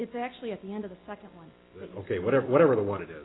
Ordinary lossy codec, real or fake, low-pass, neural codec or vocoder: AAC, 16 kbps; real; 7.2 kHz; none